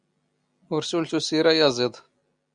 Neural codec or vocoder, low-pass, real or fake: none; 9.9 kHz; real